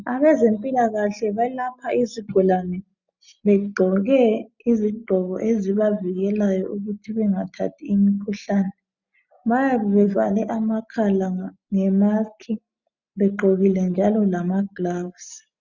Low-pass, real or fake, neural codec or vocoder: 7.2 kHz; real; none